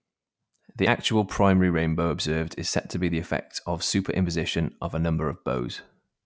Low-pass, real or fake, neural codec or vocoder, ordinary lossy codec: none; real; none; none